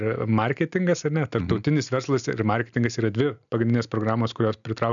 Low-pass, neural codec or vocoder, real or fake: 7.2 kHz; none; real